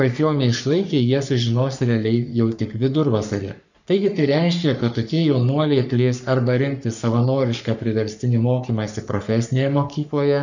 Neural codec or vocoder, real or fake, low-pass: codec, 44.1 kHz, 3.4 kbps, Pupu-Codec; fake; 7.2 kHz